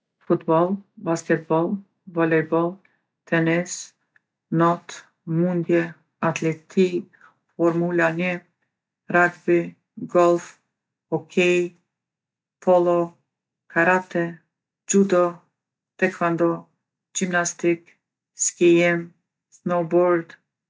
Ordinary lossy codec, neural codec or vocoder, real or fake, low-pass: none; none; real; none